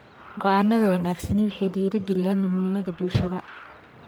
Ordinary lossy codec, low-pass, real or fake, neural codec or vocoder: none; none; fake; codec, 44.1 kHz, 1.7 kbps, Pupu-Codec